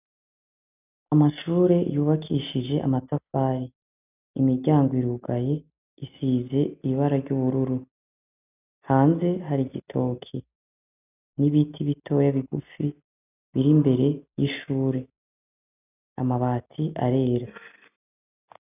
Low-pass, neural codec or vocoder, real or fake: 3.6 kHz; none; real